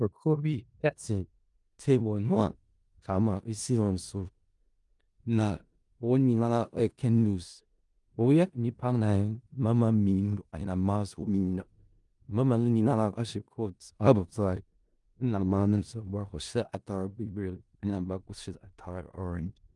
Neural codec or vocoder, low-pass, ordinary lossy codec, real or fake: codec, 16 kHz in and 24 kHz out, 0.4 kbps, LongCat-Audio-Codec, four codebook decoder; 10.8 kHz; Opus, 32 kbps; fake